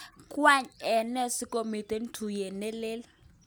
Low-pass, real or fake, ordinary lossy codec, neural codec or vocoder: none; real; none; none